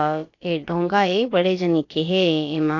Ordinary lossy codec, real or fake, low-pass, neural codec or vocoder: AAC, 48 kbps; fake; 7.2 kHz; codec, 16 kHz, about 1 kbps, DyCAST, with the encoder's durations